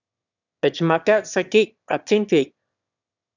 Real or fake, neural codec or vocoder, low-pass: fake; autoencoder, 22.05 kHz, a latent of 192 numbers a frame, VITS, trained on one speaker; 7.2 kHz